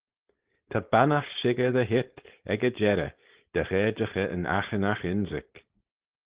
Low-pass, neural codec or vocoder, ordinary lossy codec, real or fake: 3.6 kHz; codec, 16 kHz, 4.8 kbps, FACodec; Opus, 16 kbps; fake